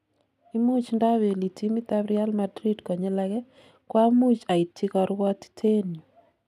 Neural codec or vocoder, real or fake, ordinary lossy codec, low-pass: none; real; none; 14.4 kHz